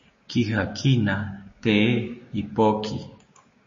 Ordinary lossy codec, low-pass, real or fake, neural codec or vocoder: MP3, 32 kbps; 7.2 kHz; fake; codec, 16 kHz, 6 kbps, DAC